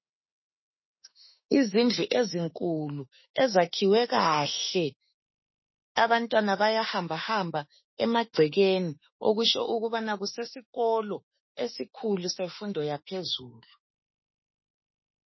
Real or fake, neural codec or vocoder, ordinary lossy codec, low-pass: fake; autoencoder, 48 kHz, 32 numbers a frame, DAC-VAE, trained on Japanese speech; MP3, 24 kbps; 7.2 kHz